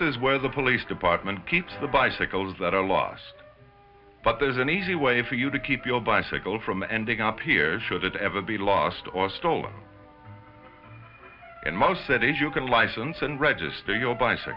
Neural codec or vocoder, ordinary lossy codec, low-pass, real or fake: none; Opus, 64 kbps; 5.4 kHz; real